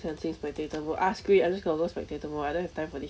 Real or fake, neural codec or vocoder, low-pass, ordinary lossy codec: real; none; none; none